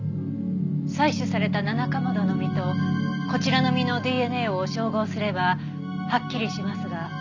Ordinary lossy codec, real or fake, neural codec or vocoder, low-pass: none; real; none; 7.2 kHz